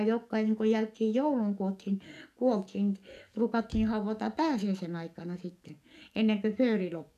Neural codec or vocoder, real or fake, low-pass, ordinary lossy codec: codec, 44.1 kHz, 7.8 kbps, DAC; fake; 14.4 kHz; none